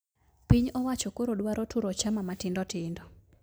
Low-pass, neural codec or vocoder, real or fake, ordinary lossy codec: none; none; real; none